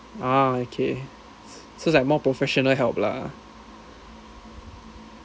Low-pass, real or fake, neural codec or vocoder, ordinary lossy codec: none; real; none; none